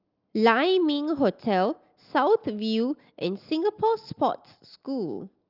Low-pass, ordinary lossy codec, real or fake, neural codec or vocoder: 5.4 kHz; Opus, 24 kbps; real; none